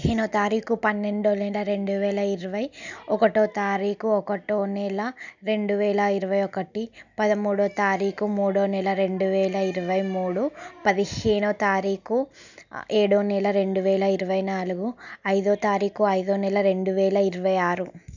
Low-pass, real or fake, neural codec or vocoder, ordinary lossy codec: 7.2 kHz; real; none; none